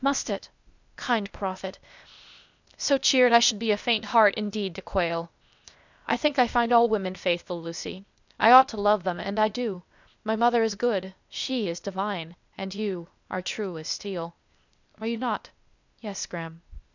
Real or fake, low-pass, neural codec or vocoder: fake; 7.2 kHz; codec, 16 kHz, 0.8 kbps, ZipCodec